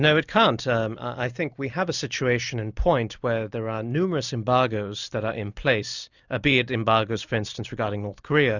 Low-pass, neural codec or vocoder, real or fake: 7.2 kHz; none; real